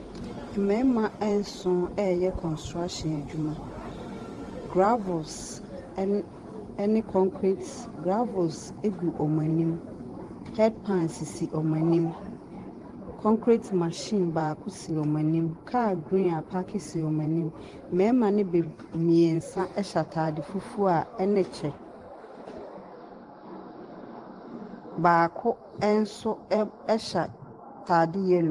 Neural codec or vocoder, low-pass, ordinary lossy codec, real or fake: vocoder, 44.1 kHz, 128 mel bands every 512 samples, BigVGAN v2; 10.8 kHz; Opus, 24 kbps; fake